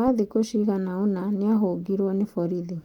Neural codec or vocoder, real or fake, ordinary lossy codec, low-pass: none; real; Opus, 32 kbps; 19.8 kHz